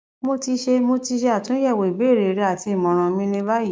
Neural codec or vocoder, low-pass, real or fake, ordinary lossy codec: codec, 16 kHz, 6 kbps, DAC; none; fake; none